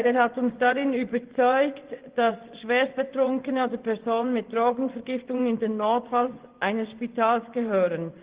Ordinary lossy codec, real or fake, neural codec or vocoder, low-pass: Opus, 16 kbps; fake; vocoder, 24 kHz, 100 mel bands, Vocos; 3.6 kHz